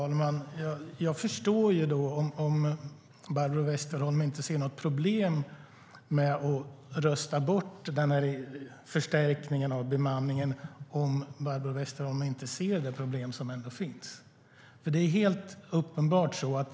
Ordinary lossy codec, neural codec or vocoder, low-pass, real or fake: none; none; none; real